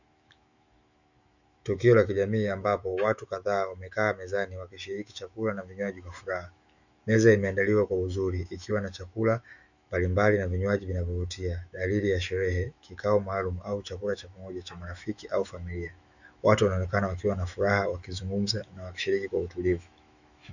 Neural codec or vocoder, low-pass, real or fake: autoencoder, 48 kHz, 128 numbers a frame, DAC-VAE, trained on Japanese speech; 7.2 kHz; fake